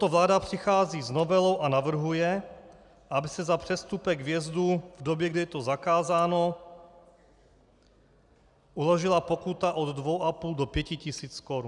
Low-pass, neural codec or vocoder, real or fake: 10.8 kHz; none; real